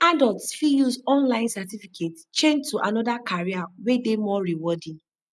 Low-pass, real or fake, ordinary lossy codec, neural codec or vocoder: none; real; none; none